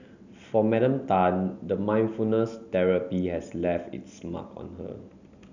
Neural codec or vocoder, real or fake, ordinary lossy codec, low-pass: none; real; none; 7.2 kHz